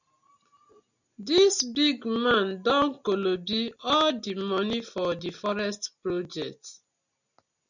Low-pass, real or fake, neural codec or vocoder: 7.2 kHz; real; none